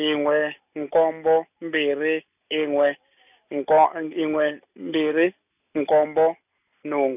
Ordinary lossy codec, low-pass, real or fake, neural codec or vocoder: none; 3.6 kHz; real; none